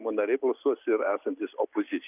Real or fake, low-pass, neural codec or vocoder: real; 3.6 kHz; none